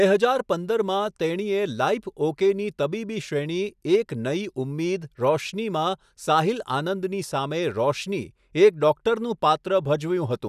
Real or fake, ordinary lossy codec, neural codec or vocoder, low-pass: real; none; none; 14.4 kHz